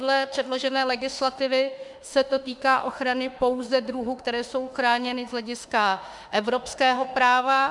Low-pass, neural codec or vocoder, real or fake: 10.8 kHz; autoencoder, 48 kHz, 32 numbers a frame, DAC-VAE, trained on Japanese speech; fake